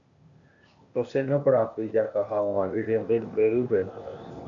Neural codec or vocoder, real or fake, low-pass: codec, 16 kHz, 0.8 kbps, ZipCodec; fake; 7.2 kHz